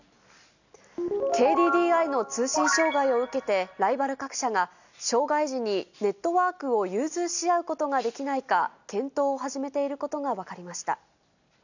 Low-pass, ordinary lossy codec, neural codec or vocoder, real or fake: 7.2 kHz; none; none; real